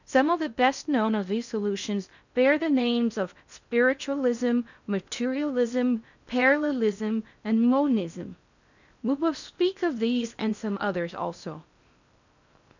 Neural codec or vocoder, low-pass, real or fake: codec, 16 kHz in and 24 kHz out, 0.6 kbps, FocalCodec, streaming, 2048 codes; 7.2 kHz; fake